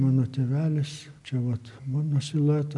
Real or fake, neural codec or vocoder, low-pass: real; none; 10.8 kHz